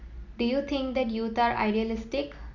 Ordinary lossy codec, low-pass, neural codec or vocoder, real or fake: none; 7.2 kHz; none; real